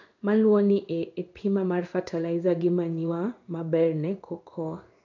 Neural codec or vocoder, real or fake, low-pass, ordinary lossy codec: codec, 16 kHz in and 24 kHz out, 1 kbps, XY-Tokenizer; fake; 7.2 kHz; none